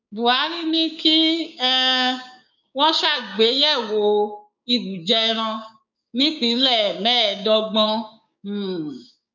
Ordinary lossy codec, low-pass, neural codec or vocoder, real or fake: none; 7.2 kHz; codec, 16 kHz, 6 kbps, DAC; fake